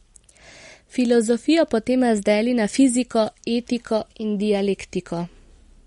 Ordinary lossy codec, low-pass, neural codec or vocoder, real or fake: MP3, 48 kbps; 10.8 kHz; none; real